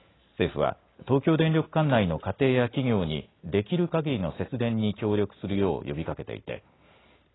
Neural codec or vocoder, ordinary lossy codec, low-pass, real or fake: none; AAC, 16 kbps; 7.2 kHz; real